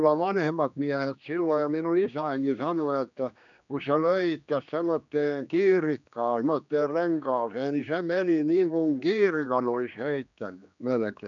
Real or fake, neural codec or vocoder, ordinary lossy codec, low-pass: fake; codec, 16 kHz, 2 kbps, X-Codec, HuBERT features, trained on general audio; none; 7.2 kHz